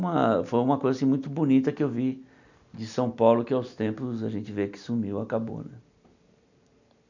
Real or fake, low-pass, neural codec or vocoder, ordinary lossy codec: real; 7.2 kHz; none; none